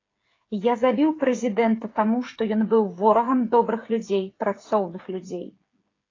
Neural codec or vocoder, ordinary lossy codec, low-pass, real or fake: codec, 16 kHz, 8 kbps, FreqCodec, smaller model; AAC, 32 kbps; 7.2 kHz; fake